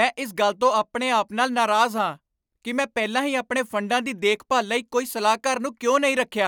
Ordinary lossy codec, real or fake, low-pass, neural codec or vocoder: none; real; none; none